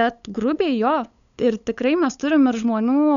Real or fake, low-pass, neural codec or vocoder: fake; 7.2 kHz; codec, 16 kHz, 8 kbps, FunCodec, trained on LibriTTS, 25 frames a second